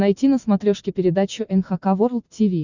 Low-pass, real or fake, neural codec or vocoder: 7.2 kHz; real; none